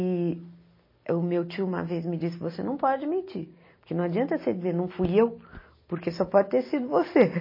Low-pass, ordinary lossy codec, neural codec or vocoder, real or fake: 5.4 kHz; MP3, 24 kbps; none; real